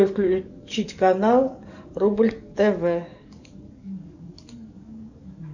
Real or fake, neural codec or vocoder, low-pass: fake; codec, 44.1 kHz, 7.8 kbps, DAC; 7.2 kHz